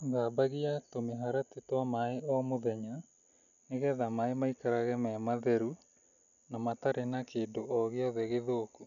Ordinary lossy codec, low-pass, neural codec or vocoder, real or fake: none; 7.2 kHz; none; real